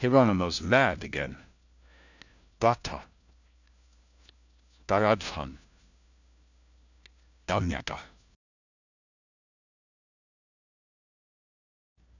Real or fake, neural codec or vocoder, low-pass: fake; codec, 16 kHz, 1 kbps, FunCodec, trained on LibriTTS, 50 frames a second; 7.2 kHz